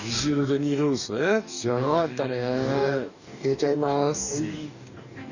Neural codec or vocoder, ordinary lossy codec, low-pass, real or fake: codec, 44.1 kHz, 2.6 kbps, DAC; none; 7.2 kHz; fake